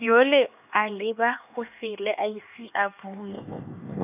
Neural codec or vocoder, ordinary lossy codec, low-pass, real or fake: codec, 16 kHz, 2 kbps, X-Codec, HuBERT features, trained on LibriSpeech; none; 3.6 kHz; fake